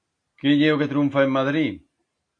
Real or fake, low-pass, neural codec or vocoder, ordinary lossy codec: real; 9.9 kHz; none; AAC, 48 kbps